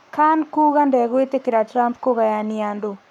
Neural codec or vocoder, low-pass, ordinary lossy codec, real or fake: codec, 44.1 kHz, 7.8 kbps, Pupu-Codec; 19.8 kHz; none; fake